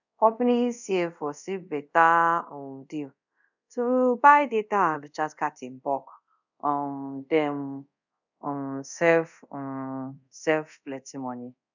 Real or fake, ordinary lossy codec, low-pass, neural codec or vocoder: fake; none; 7.2 kHz; codec, 24 kHz, 0.5 kbps, DualCodec